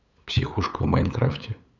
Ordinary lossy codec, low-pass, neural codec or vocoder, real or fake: none; 7.2 kHz; codec, 16 kHz, 8 kbps, FunCodec, trained on LibriTTS, 25 frames a second; fake